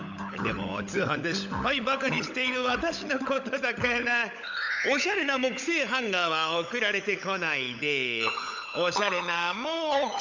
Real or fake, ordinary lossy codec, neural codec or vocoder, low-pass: fake; none; codec, 16 kHz, 16 kbps, FunCodec, trained on LibriTTS, 50 frames a second; 7.2 kHz